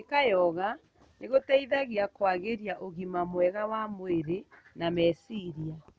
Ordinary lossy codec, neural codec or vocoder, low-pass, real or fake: none; none; none; real